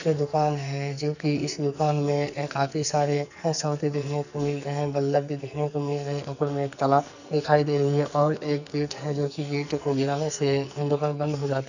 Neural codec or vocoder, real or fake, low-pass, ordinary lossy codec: codec, 44.1 kHz, 2.6 kbps, SNAC; fake; 7.2 kHz; none